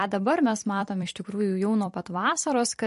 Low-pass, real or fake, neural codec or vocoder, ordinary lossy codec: 14.4 kHz; fake; vocoder, 44.1 kHz, 128 mel bands every 256 samples, BigVGAN v2; MP3, 48 kbps